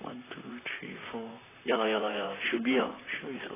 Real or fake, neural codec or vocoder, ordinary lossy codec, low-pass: fake; vocoder, 44.1 kHz, 128 mel bands, Pupu-Vocoder; AAC, 16 kbps; 3.6 kHz